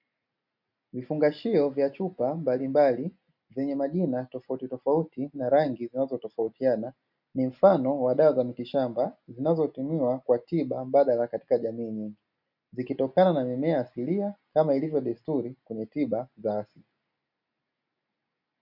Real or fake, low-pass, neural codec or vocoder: real; 5.4 kHz; none